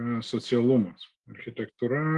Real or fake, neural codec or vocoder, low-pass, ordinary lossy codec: real; none; 10.8 kHz; Opus, 24 kbps